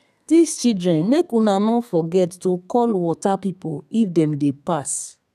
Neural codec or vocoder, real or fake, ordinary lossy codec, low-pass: codec, 32 kHz, 1.9 kbps, SNAC; fake; none; 14.4 kHz